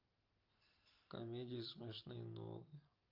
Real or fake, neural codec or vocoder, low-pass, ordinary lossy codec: real; none; 5.4 kHz; Opus, 24 kbps